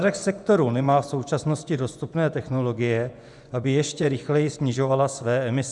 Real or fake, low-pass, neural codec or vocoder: fake; 10.8 kHz; vocoder, 24 kHz, 100 mel bands, Vocos